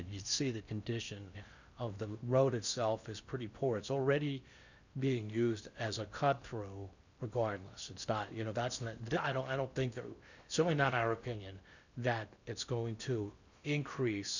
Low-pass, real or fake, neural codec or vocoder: 7.2 kHz; fake; codec, 16 kHz in and 24 kHz out, 0.6 kbps, FocalCodec, streaming, 4096 codes